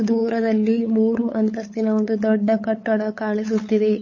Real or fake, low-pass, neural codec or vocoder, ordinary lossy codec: fake; 7.2 kHz; codec, 16 kHz, 8 kbps, FunCodec, trained on LibriTTS, 25 frames a second; MP3, 32 kbps